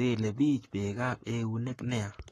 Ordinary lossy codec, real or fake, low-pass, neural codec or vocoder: AAC, 32 kbps; fake; 19.8 kHz; codec, 44.1 kHz, 7.8 kbps, Pupu-Codec